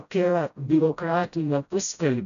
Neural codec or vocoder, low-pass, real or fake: codec, 16 kHz, 0.5 kbps, FreqCodec, smaller model; 7.2 kHz; fake